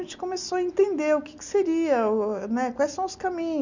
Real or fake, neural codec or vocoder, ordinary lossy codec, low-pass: real; none; none; 7.2 kHz